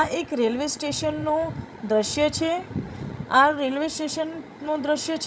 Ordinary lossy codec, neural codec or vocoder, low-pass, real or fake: none; codec, 16 kHz, 16 kbps, FreqCodec, larger model; none; fake